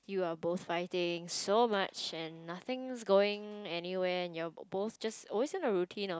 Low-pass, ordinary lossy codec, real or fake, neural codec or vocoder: none; none; real; none